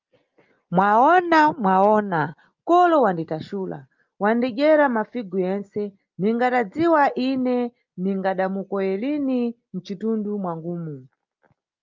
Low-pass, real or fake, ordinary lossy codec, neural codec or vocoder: 7.2 kHz; real; Opus, 24 kbps; none